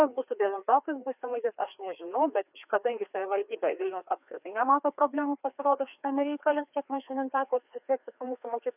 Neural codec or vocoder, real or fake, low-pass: codec, 16 kHz, 2 kbps, FreqCodec, larger model; fake; 3.6 kHz